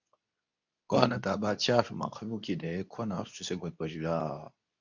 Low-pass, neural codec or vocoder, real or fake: 7.2 kHz; codec, 24 kHz, 0.9 kbps, WavTokenizer, medium speech release version 2; fake